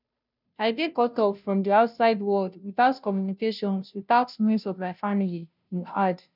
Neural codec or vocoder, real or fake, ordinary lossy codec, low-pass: codec, 16 kHz, 0.5 kbps, FunCodec, trained on Chinese and English, 25 frames a second; fake; none; 5.4 kHz